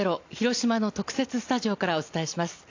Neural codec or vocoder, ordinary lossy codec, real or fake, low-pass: none; AAC, 48 kbps; real; 7.2 kHz